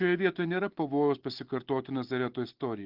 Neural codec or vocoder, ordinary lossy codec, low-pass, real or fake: none; Opus, 16 kbps; 5.4 kHz; real